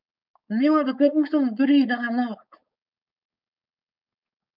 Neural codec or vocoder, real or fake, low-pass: codec, 16 kHz, 4.8 kbps, FACodec; fake; 5.4 kHz